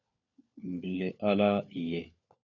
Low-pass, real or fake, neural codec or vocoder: 7.2 kHz; fake; codec, 16 kHz, 4 kbps, FunCodec, trained on LibriTTS, 50 frames a second